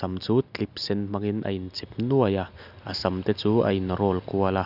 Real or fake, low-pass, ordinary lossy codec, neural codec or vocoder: real; 5.4 kHz; none; none